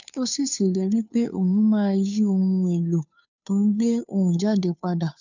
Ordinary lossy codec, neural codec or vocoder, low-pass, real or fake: none; codec, 16 kHz, 2 kbps, FunCodec, trained on Chinese and English, 25 frames a second; 7.2 kHz; fake